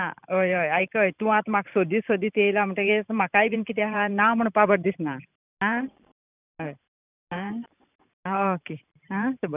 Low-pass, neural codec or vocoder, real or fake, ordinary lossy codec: 3.6 kHz; vocoder, 44.1 kHz, 128 mel bands every 512 samples, BigVGAN v2; fake; none